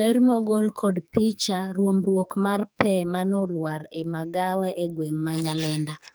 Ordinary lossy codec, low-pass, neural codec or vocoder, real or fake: none; none; codec, 44.1 kHz, 2.6 kbps, SNAC; fake